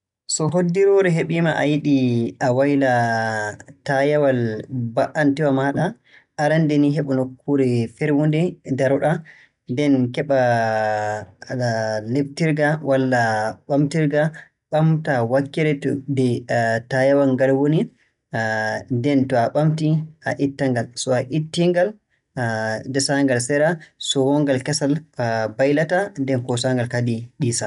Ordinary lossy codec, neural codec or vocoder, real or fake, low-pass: none; none; real; 10.8 kHz